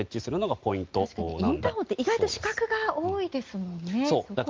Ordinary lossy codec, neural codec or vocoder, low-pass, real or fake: Opus, 32 kbps; none; 7.2 kHz; real